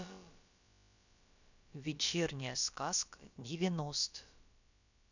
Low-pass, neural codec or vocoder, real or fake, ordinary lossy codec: 7.2 kHz; codec, 16 kHz, about 1 kbps, DyCAST, with the encoder's durations; fake; none